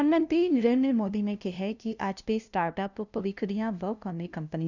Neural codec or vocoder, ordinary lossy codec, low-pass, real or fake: codec, 16 kHz, 0.5 kbps, FunCodec, trained on LibriTTS, 25 frames a second; none; 7.2 kHz; fake